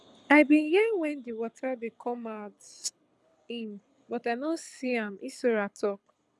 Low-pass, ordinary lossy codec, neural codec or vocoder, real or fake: none; none; codec, 24 kHz, 6 kbps, HILCodec; fake